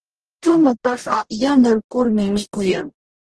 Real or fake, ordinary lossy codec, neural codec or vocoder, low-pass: fake; Opus, 16 kbps; codec, 44.1 kHz, 0.9 kbps, DAC; 10.8 kHz